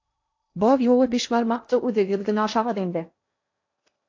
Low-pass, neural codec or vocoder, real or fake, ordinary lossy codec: 7.2 kHz; codec, 16 kHz in and 24 kHz out, 0.8 kbps, FocalCodec, streaming, 65536 codes; fake; AAC, 48 kbps